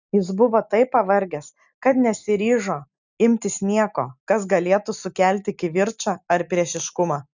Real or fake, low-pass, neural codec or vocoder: real; 7.2 kHz; none